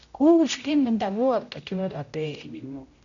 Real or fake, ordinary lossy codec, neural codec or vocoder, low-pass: fake; none; codec, 16 kHz, 0.5 kbps, X-Codec, HuBERT features, trained on general audio; 7.2 kHz